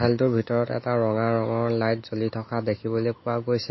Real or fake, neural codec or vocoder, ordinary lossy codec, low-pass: real; none; MP3, 24 kbps; 7.2 kHz